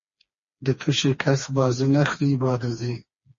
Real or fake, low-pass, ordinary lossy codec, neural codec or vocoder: fake; 7.2 kHz; MP3, 32 kbps; codec, 16 kHz, 2 kbps, FreqCodec, smaller model